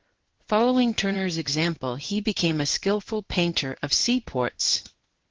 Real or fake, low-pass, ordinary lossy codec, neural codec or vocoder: fake; 7.2 kHz; Opus, 16 kbps; codec, 16 kHz in and 24 kHz out, 1 kbps, XY-Tokenizer